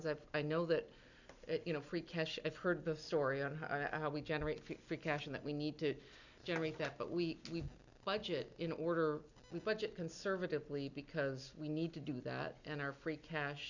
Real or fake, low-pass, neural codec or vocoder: real; 7.2 kHz; none